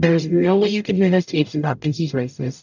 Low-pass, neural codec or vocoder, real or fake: 7.2 kHz; codec, 44.1 kHz, 0.9 kbps, DAC; fake